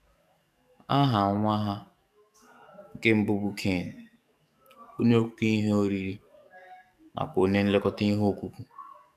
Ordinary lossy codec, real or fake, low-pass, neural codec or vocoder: none; fake; 14.4 kHz; codec, 44.1 kHz, 7.8 kbps, DAC